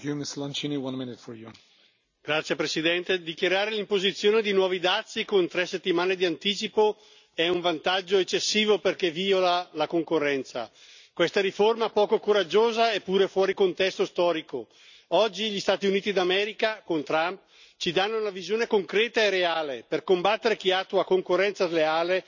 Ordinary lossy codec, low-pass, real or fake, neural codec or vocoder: none; 7.2 kHz; real; none